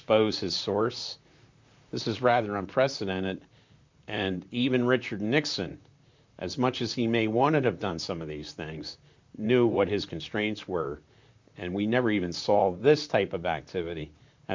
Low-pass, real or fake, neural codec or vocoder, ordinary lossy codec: 7.2 kHz; fake; vocoder, 44.1 kHz, 128 mel bands, Pupu-Vocoder; MP3, 64 kbps